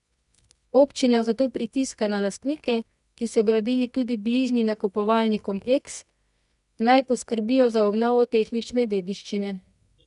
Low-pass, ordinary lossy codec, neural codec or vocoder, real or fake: 10.8 kHz; none; codec, 24 kHz, 0.9 kbps, WavTokenizer, medium music audio release; fake